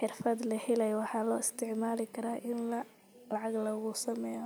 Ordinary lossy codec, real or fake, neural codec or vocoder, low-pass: none; real; none; none